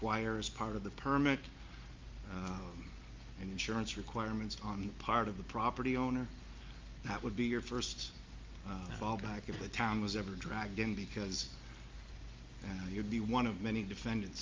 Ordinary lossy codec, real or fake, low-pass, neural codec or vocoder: Opus, 32 kbps; real; 7.2 kHz; none